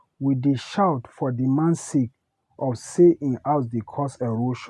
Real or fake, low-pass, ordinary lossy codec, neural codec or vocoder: real; none; none; none